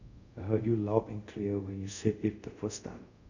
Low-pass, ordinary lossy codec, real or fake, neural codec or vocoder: 7.2 kHz; none; fake; codec, 24 kHz, 0.5 kbps, DualCodec